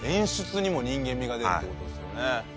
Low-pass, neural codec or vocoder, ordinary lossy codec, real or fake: none; none; none; real